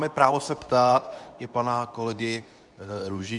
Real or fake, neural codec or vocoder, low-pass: fake; codec, 24 kHz, 0.9 kbps, WavTokenizer, medium speech release version 2; 10.8 kHz